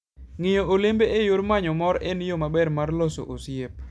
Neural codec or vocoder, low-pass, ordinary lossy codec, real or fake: none; none; none; real